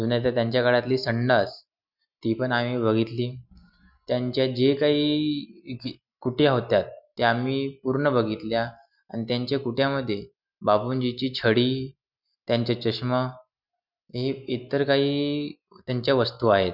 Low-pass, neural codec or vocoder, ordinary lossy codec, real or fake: 5.4 kHz; none; none; real